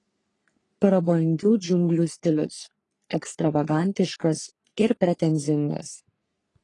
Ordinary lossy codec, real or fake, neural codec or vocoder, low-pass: AAC, 32 kbps; fake; codec, 44.1 kHz, 3.4 kbps, Pupu-Codec; 10.8 kHz